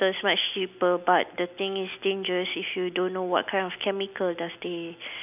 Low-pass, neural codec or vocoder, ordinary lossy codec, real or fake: 3.6 kHz; none; none; real